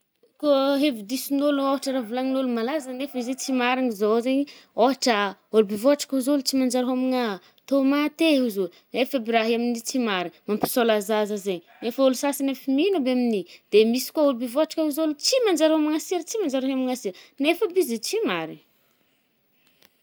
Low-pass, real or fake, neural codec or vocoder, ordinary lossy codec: none; real; none; none